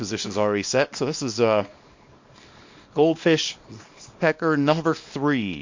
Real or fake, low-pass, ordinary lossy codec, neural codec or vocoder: fake; 7.2 kHz; MP3, 48 kbps; codec, 24 kHz, 0.9 kbps, WavTokenizer, small release